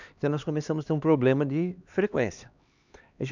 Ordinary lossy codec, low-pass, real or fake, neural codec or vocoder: none; 7.2 kHz; fake; codec, 16 kHz, 2 kbps, X-Codec, HuBERT features, trained on LibriSpeech